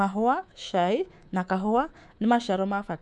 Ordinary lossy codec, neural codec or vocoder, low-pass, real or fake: none; codec, 24 kHz, 3.1 kbps, DualCodec; none; fake